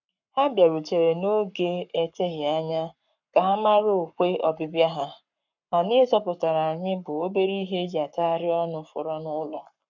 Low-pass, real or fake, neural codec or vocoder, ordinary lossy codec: 7.2 kHz; fake; codec, 44.1 kHz, 7.8 kbps, Pupu-Codec; none